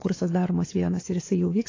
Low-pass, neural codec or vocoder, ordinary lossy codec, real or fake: 7.2 kHz; codec, 16 kHz, 4 kbps, X-Codec, WavLM features, trained on Multilingual LibriSpeech; AAC, 32 kbps; fake